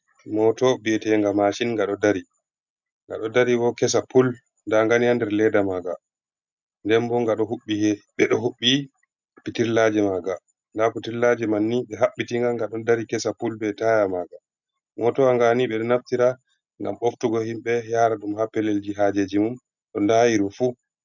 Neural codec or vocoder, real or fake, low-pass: none; real; 7.2 kHz